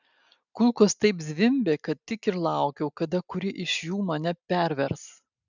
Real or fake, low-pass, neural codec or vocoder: real; 7.2 kHz; none